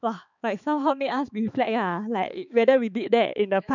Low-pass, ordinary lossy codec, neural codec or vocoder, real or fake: 7.2 kHz; none; codec, 16 kHz, 4 kbps, X-Codec, HuBERT features, trained on balanced general audio; fake